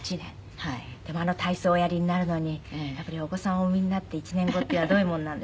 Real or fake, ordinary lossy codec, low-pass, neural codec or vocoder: real; none; none; none